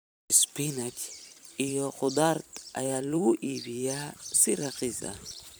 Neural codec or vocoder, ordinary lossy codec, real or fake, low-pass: vocoder, 44.1 kHz, 128 mel bands every 512 samples, BigVGAN v2; none; fake; none